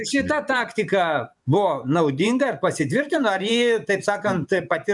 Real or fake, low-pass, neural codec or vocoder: fake; 10.8 kHz; vocoder, 24 kHz, 100 mel bands, Vocos